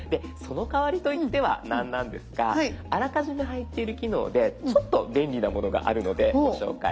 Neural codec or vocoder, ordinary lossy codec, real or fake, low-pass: none; none; real; none